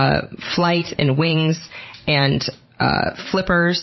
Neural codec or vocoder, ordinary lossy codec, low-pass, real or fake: vocoder, 44.1 kHz, 128 mel bands every 512 samples, BigVGAN v2; MP3, 24 kbps; 7.2 kHz; fake